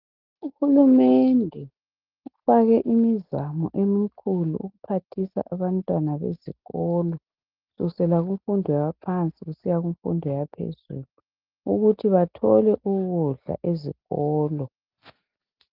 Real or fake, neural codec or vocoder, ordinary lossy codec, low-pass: real; none; Opus, 32 kbps; 5.4 kHz